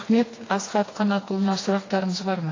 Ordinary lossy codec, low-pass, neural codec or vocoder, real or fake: AAC, 32 kbps; 7.2 kHz; codec, 16 kHz, 2 kbps, FreqCodec, smaller model; fake